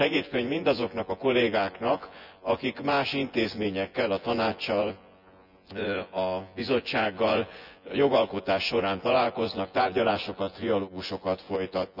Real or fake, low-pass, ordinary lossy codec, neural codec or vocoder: fake; 5.4 kHz; none; vocoder, 24 kHz, 100 mel bands, Vocos